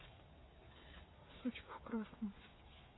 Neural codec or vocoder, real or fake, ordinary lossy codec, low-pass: codec, 16 kHz, 8 kbps, FreqCodec, smaller model; fake; AAC, 16 kbps; 7.2 kHz